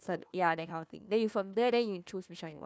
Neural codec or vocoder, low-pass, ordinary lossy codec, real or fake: codec, 16 kHz, 1 kbps, FunCodec, trained on Chinese and English, 50 frames a second; none; none; fake